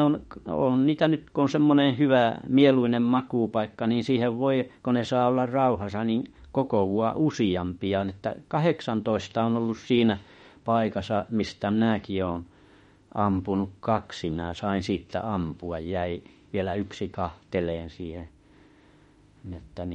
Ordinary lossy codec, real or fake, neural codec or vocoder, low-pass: MP3, 48 kbps; fake; autoencoder, 48 kHz, 32 numbers a frame, DAC-VAE, trained on Japanese speech; 19.8 kHz